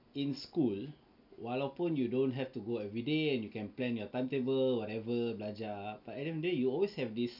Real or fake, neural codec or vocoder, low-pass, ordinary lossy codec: real; none; 5.4 kHz; none